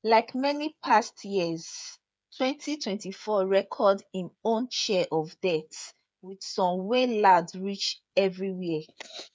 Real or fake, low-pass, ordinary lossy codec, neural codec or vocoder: fake; none; none; codec, 16 kHz, 8 kbps, FreqCodec, smaller model